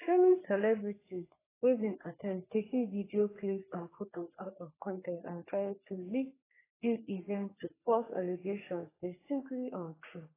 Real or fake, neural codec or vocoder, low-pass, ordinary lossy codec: fake; codec, 44.1 kHz, 3.4 kbps, Pupu-Codec; 3.6 kHz; AAC, 16 kbps